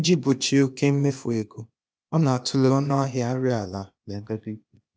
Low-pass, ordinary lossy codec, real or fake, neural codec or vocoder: none; none; fake; codec, 16 kHz, 0.8 kbps, ZipCodec